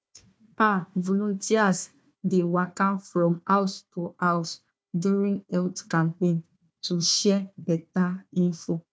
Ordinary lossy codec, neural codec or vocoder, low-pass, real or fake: none; codec, 16 kHz, 1 kbps, FunCodec, trained on Chinese and English, 50 frames a second; none; fake